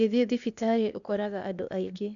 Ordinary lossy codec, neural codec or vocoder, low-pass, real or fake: none; codec, 16 kHz, 0.8 kbps, ZipCodec; 7.2 kHz; fake